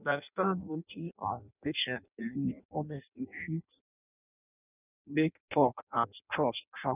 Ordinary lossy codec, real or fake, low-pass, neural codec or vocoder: none; fake; 3.6 kHz; codec, 16 kHz in and 24 kHz out, 0.6 kbps, FireRedTTS-2 codec